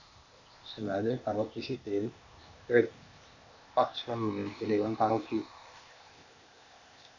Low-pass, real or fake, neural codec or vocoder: 7.2 kHz; fake; codec, 16 kHz, 0.8 kbps, ZipCodec